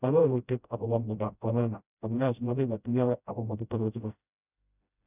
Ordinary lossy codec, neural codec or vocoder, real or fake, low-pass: none; codec, 16 kHz, 0.5 kbps, FreqCodec, smaller model; fake; 3.6 kHz